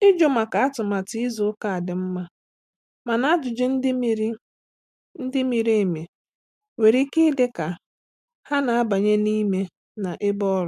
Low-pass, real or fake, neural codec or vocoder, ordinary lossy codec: 14.4 kHz; real; none; MP3, 96 kbps